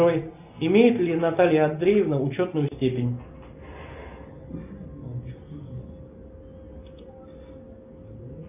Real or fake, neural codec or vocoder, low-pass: real; none; 3.6 kHz